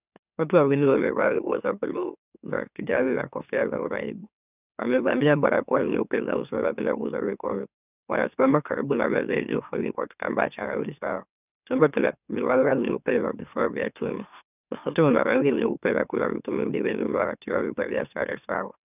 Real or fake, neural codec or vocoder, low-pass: fake; autoencoder, 44.1 kHz, a latent of 192 numbers a frame, MeloTTS; 3.6 kHz